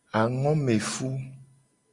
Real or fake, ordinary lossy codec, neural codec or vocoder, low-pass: real; Opus, 64 kbps; none; 10.8 kHz